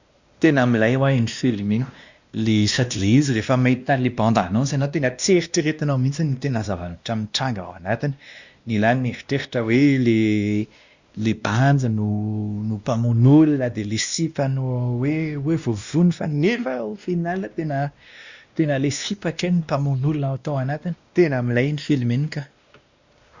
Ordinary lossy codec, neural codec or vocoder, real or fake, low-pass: Opus, 64 kbps; codec, 16 kHz, 1 kbps, X-Codec, WavLM features, trained on Multilingual LibriSpeech; fake; 7.2 kHz